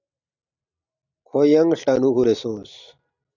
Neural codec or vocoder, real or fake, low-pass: none; real; 7.2 kHz